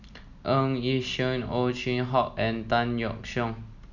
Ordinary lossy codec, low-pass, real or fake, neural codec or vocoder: none; 7.2 kHz; real; none